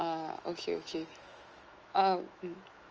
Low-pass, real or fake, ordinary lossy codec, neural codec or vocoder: 7.2 kHz; fake; Opus, 24 kbps; autoencoder, 48 kHz, 128 numbers a frame, DAC-VAE, trained on Japanese speech